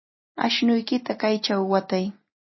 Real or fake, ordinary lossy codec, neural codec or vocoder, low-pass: real; MP3, 24 kbps; none; 7.2 kHz